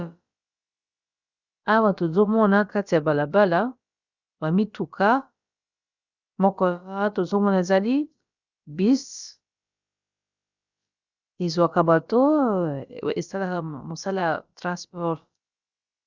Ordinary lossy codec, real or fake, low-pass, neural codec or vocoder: Opus, 64 kbps; fake; 7.2 kHz; codec, 16 kHz, about 1 kbps, DyCAST, with the encoder's durations